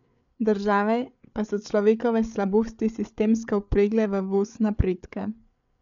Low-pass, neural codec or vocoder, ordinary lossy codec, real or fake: 7.2 kHz; codec, 16 kHz, 8 kbps, FreqCodec, larger model; none; fake